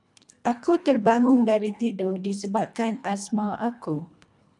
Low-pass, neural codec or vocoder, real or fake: 10.8 kHz; codec, 24 kHz, 1.5 kbps, HILCodec; fake